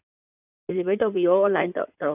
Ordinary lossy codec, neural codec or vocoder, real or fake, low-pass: none; codec, 16 kHz in and 24 kHz out, 2.2 kbps, FireRedTTS-2 codec; fake; 3.6 kHz